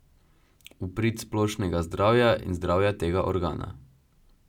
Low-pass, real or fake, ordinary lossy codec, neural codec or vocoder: 19.8 kHz; real; none; none